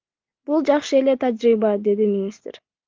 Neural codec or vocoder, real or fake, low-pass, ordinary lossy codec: none; real; 7.2 kHz; Opus, 32 kbps